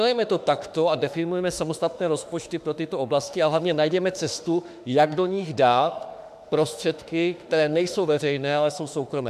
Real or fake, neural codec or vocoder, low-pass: fake; autoencoder, 48 kHz, 32 numbers a frame, DAC-VAE, trained on Japanese speech; 14.4 kHz